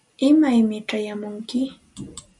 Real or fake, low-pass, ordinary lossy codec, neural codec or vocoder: real; 10.8 kHz; MP3, 96 kbps; none